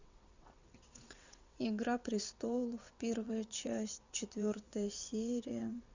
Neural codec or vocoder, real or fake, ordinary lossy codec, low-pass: vocoder, 22.05 kHz, 80 mel bands, WaveNeXt; fake; Opus, 64 kbps; 7.2 kHz